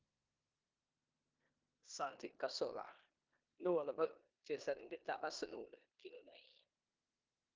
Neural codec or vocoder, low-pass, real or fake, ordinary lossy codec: codec, 16 kHz in and 24 kHz out, 0.9 kbps, LongCat-Audio-Codec, four codebook decoder; 7.2 kHz; fake; Opus, 32 kbps